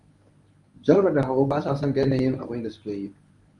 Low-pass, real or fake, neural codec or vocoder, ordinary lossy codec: 10.8 kHz; fake; codec, 24 kHz, 0.9 kbps, WavTokenizer, medium speech release version 1; MP3, 96 kbps